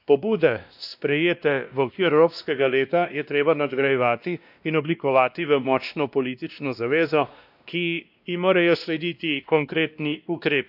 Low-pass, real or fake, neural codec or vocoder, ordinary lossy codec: 5.4 kHz; fake; codec, 16 kHz, 1 kbps, X-Codec, WavLM features, trained on Multilingual LibriSpeech; none